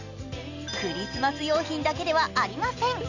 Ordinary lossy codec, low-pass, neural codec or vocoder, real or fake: none; 7.2 kHz; none; real